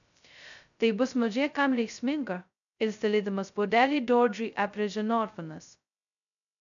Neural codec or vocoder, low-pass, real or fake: codec, 16 kHz, 0.2 kbps, FocalCodec; 7.2 kHz; fake